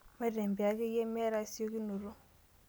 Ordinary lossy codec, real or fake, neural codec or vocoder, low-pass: none; real; none; none